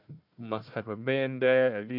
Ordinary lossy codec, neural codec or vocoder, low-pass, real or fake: none; codec, 16 kHz, 1 kbps, FunCodec, trained on Chinese and English, 50 frames a second; 5.4 kHz; fake